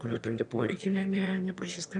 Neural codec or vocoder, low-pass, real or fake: autoencoder, 22.05 kHz, a latent of 192 numbers a frame, VITS, trained on one speaker; 9.9 kHz; fake